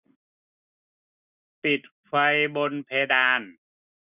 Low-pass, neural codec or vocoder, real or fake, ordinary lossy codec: 3.6 kHz; none; real; none